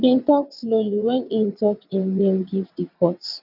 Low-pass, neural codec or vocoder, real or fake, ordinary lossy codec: 5.4 kHz; vocoder, 22.05 kHz, 80 mel bands, WaveNeXt; fake; none